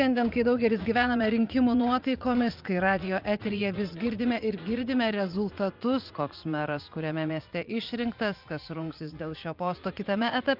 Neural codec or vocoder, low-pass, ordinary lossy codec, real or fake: vocoder, 44.1 kHz, 80 mel bands, Vocos; 5.4 kHz; Opus, 32 kbps; fake